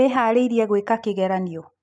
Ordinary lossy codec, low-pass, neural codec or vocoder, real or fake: none; none; none; real